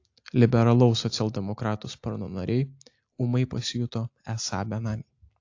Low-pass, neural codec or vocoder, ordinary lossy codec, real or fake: 7.2 kHz; none; AAC, 48 kbps; real